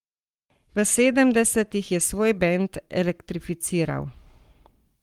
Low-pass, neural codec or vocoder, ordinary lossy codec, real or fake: 19.8 kHz; codec, 44.1 kHz, 7.8 kbps, Pupu-Codec; Opus, 24 kbps; fake